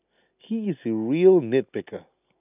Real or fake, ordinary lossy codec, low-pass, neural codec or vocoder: real; none; 3.6 kHz; none